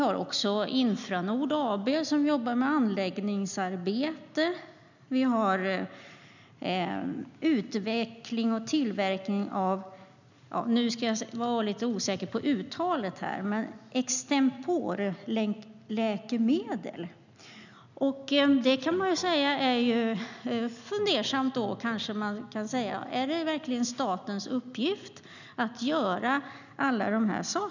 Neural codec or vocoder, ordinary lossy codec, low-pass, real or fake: none; none; 7.2 kHz; real